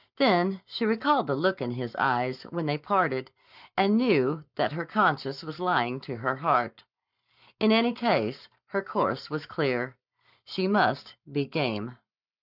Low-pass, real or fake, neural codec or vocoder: 5.4 kHz; fake; codec, 44.1 kHz, 7.8 kbps, Pupu-Codec